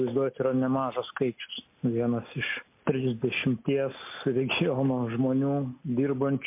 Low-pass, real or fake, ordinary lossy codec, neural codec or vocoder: 3.6 kHz; real; MP3, 24 kbps; none